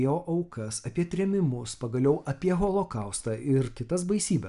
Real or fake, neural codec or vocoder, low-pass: real; none; 10.8 kHz